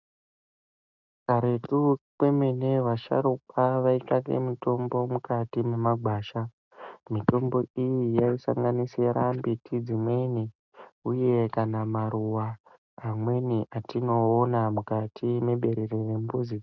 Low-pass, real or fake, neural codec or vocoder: 7.2 kHz; real; none